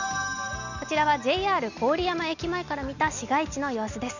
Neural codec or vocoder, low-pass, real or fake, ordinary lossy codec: none; 7.2 kHz; real; none